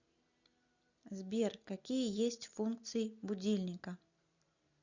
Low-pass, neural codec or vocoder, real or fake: 7.2 kHz; none; real